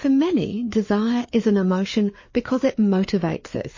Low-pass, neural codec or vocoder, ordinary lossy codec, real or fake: 7.2 kHz; codec, 16 kHz, 4 kbps, FreqCodec, larger model; MP3, 32 kbps; fake